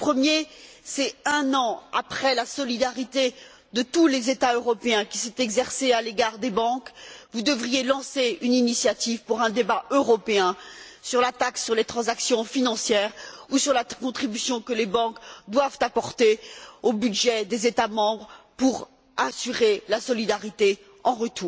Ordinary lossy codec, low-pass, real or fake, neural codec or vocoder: none; none; real; none